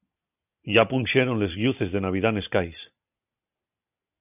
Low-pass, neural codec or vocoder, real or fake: 3.6 kHz; none; real